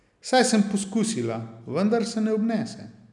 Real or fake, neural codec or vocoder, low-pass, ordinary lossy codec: real; none; 10.8 kHz; none